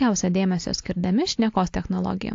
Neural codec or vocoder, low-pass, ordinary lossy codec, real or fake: none; 7.2 kHz; AAC, 48 kbps; real